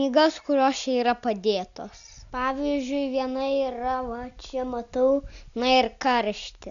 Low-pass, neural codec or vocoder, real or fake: 7.2 kHz; none; real